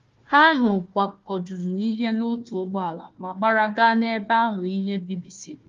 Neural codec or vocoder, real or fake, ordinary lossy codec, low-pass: codec, 16 kHz, 1 kbps, FunCodec, trained on Chinese and English, 50 frames a second; fake; Opus, 32 kbps; 7.2 kHz